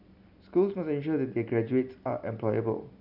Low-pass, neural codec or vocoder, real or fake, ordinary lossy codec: 5.4 kHz; none; real; none